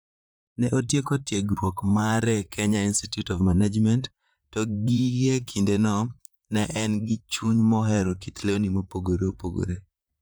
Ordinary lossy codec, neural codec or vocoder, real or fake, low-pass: none; vocoder, 44.1 kHz, 128 mel bands, Pupu-Vocoder; fake; none